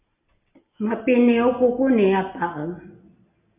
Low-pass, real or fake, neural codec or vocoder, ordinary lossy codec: 3.6 kHz; real; none; MP3, 32 kbps